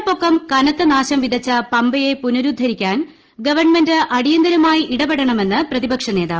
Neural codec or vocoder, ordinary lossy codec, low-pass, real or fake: none; Opus, 16 kbps; 7.2 kHz; real